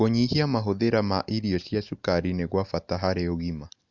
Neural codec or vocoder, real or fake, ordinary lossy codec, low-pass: none; real; Opus, 64 kbps; 7.2 kHz